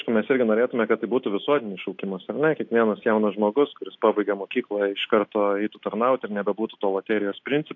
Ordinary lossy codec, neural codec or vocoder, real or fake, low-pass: AAC, 48 kbps; none; real; 7.2 kHz